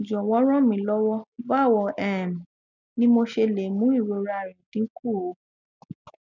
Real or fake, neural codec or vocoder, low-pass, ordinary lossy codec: real; none; 7.2 kHz; none